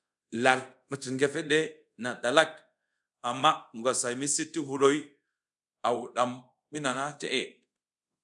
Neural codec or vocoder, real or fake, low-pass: codec, 24 kHz, 0.5 kbps, DualCodec; fake; 10.8 kHz